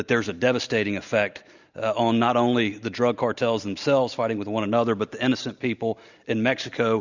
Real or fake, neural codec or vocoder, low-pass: real; none; 7.2 kHz